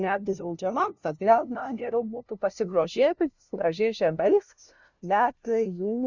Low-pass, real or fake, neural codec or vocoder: 7.2 kHz; fake; codec, 16 kHz, 0.5 kbps, FunCodec, trained on LibriTTS, 25 frames a second